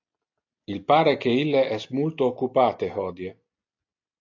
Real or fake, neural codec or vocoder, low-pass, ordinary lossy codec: real; none; 7.2 kHz; AAC, 48 kbps